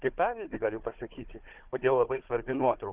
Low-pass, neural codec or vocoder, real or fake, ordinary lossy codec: 3.6 kHz; codec, 16 kHz, 4 kbps, FunCodec, trained on Chinese and English, 50 frames a second; fake; Opus, 16 kbps